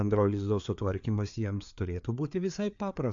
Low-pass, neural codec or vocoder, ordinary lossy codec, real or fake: 7.2 kHz; codec, 16 kHz, 4 kbps, FreqCodec, larger model; MP3, 64 kbps; fake